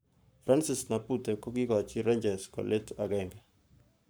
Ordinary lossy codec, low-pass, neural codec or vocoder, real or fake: none; none; codec, 44.1 kHz, 7.8 kbps, Pupu-Codec; fake